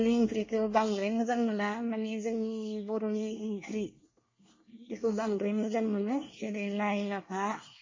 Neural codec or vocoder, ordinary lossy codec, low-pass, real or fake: codec, 24 kHz, 1 kbps, SNAC; MP3, 32 kbps; 7.2 kHz; fake